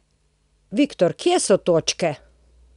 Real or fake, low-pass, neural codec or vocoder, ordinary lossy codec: real; 10.8 kHz; none; none